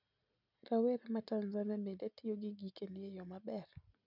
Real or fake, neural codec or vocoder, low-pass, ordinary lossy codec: real; none; 5.4 kHz; none